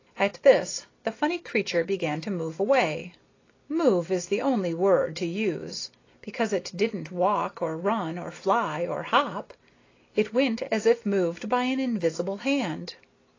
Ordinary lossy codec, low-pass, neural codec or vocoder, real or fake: AAC, 32 kbps; 7.2 kHz; none; real